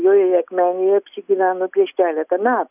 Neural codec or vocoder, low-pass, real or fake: none; 3.6 kHz; real